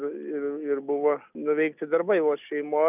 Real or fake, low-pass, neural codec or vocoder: real; 3.6 kHz; none